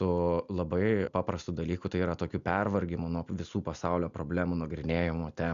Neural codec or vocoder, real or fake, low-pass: none; real; 7.2 kHz